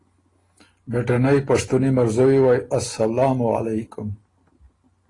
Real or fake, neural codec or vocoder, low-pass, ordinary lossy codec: real; none; 10.8 kHz; AAC, 32 kbps